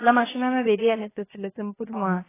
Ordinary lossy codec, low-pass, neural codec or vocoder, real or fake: AAC, 16 kbps; 3.6 kHz; codec, 16 kHz, 0.7 kbps, FocalCodec; fake